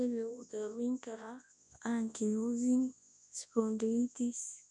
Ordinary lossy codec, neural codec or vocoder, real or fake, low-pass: none; codec, 24 kHz, 0.9 kbps, WavTokenizer, large speech release; fake; 10.8 kHz